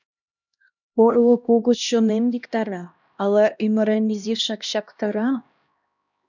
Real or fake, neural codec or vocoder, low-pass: fake; codec, 16 kHz, 1 kbps, X-Codec, HuBERT features, trained on LibriSpeech; 7.2 kHz